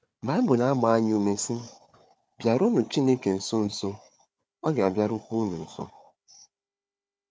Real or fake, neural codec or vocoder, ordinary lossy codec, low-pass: fake; codec, 16 kHz, 4 kbps, FunCodec, trained on Chinese and English, 50 frames a second; none; none